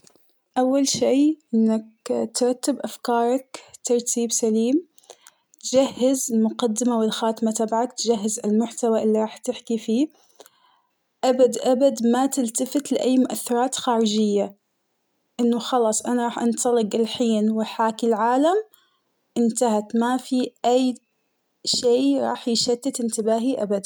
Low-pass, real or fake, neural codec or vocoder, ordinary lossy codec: none; real; none; none